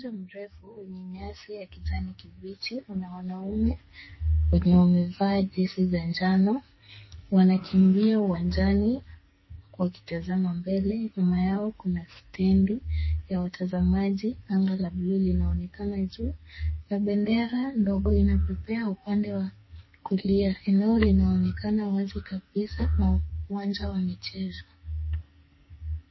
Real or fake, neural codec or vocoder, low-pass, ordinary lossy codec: fake; codec, 44.1 kHz, 2.6 kbps, SNAC; 7.2 kHz; MP3, 24 kbps